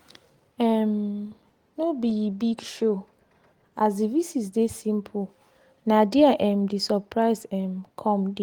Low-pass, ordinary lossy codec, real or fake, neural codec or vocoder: 19.8 kHz; Opus, 24 kbps; real; none